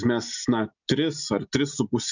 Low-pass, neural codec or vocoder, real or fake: 7.2 kHz; none; real